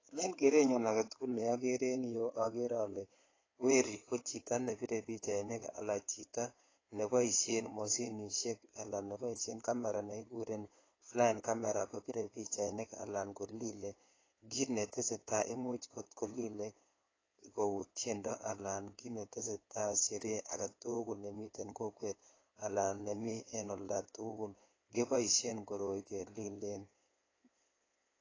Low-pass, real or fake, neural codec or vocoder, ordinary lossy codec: 7.2 kHz; fake; codec, 16 kHz in and 24 kHz out, 2.2 kbps, FireRedTTS-2 codec; AAC, 32 kbps